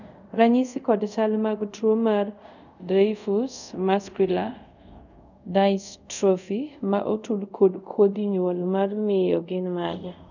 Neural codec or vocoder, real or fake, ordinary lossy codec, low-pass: codec, 24 kHz, 0.5 kbps, DualCodec; fake; none; 7.2 kHz